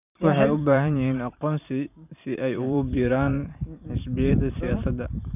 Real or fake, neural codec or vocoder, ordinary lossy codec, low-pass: fake; vocoder, 44.1 kHz, 128 mel bands every 512 samples, BigVGAN v2; none; 3.6 kHz